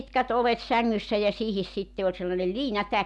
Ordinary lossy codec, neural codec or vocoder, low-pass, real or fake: none; none; none; real